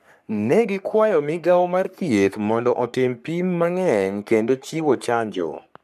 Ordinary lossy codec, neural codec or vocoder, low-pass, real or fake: none; codec, 44.1 kHz, 3.4 kbps, Pupu-Codec; 14.4 kHz; fake